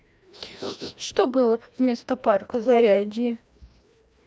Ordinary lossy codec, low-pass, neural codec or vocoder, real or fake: none; none; codec, 16 kHz, 1 kbps, FreqCodec, larger model; fake